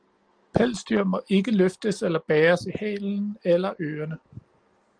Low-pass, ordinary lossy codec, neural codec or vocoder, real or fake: 9.9 kHz; Opus, 24 kbps; none; real